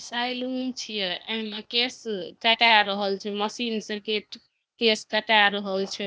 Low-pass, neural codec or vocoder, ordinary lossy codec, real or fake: none; codec, 16 kHz, 0.8 kbps, ZipCodec; none; fake